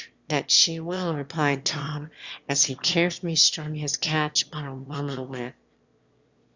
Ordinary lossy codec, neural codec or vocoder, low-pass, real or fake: Opus, 64 kbps; autoencoder, 22.05 kHz, a latent of 192 numbers a frame, VITS, trained on one speaker; 7.2 kHz; fake